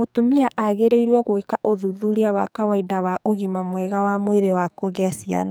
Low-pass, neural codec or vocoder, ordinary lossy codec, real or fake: none; codec, 44.1 kHz, 2.6 kbps, SNAC; none; fake